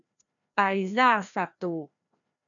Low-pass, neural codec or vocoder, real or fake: 7.2 kHz; codec, 16 kHz, 1 kbps, FreqCodec, larger model; fake